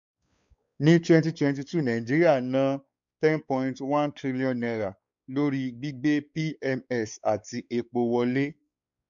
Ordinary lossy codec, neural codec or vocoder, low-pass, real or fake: none; codec, 16 kHz, 4 kbps, X-Codec, WavLM features, trained on Multilingual LibriSpeech; 7.2 kHz; fake